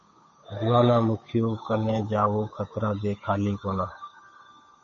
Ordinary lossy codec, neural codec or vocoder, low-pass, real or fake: MP3, 32 kbps; codec, 16 kHz, 8 kbps, FunCodec, trained on Chinese and English, 25 frames a second; 7.2 kHz; fake